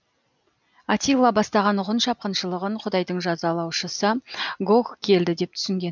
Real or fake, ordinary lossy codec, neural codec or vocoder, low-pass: real; none; none; 7.2 kHz